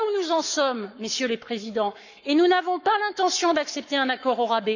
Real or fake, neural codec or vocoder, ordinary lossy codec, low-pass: fake; codec, 44.1 kHz, 7.8 kbps, Pupu-Codec; none; 7.2 kHz